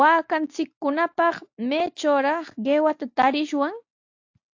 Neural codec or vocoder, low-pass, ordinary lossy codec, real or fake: none; 7.2 kHz; AAC, 48 kbps; real